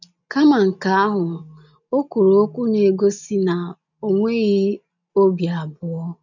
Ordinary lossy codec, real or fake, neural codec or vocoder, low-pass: none; real; none; 7.2 kHz